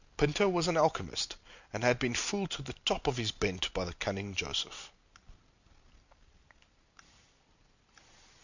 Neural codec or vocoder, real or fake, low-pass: none; real; 7.2 kHz